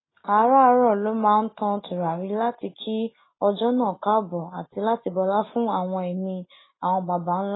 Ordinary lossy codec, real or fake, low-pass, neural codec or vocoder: AAC, 16 kbps; real; 7.2 kHz; none